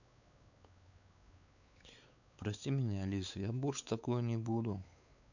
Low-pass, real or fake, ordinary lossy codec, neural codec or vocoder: 7.2 kHz; fake; none; codec, 16 kHz, 4 kbps, X-Codec, WavLM features, trained on Multilingual LibriSpeech